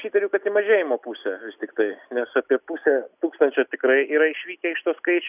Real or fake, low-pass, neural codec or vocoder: real; 3.6 kHz; none